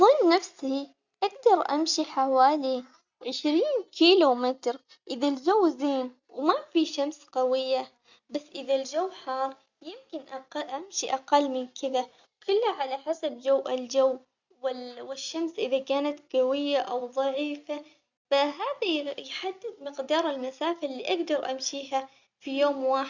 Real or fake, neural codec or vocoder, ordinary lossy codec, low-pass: real; none; Opus, 64 kbps; 7.2 kHz